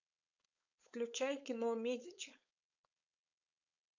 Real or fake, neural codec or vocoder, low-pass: fake; codec, 16 kHz, 4.8 kbps, FACodec; 7.2 kHz